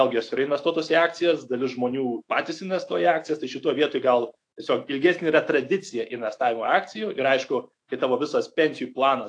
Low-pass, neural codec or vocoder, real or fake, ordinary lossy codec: 9.9 kHz; autoencoder, 48 kHz, 128 numbers a frame, DAC-VAE, trained on Japanese speech; fake; AAC, 48 kbps